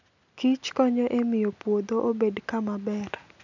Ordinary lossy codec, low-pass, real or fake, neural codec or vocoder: none; 7.2 kHz; real; none